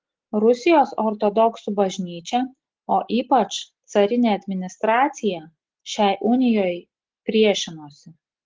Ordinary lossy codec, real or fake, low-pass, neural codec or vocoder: Opus, 16 kbps; real; 7.2 kHz; none